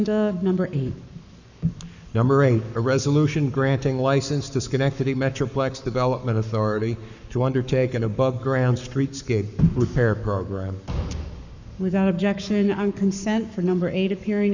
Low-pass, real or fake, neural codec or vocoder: 7.2 kHz; fake; codec, 44.1 kHz, 7.8 kbps, Pupu-Codec